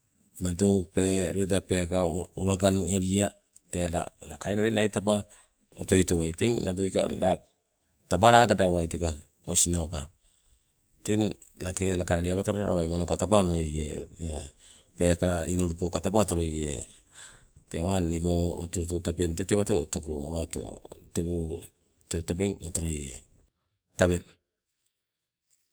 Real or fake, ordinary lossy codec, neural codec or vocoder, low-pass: fake; none; codec, 44.1 kHz, 2.6 kbps, SNAC; none